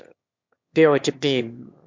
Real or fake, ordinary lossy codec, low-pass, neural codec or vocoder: fake; MP3, 64 kbps; 7.2 kHz; autoencoder, 22.05 kHz, a latent of 192 numbers a frame, VITS, trained on one speaker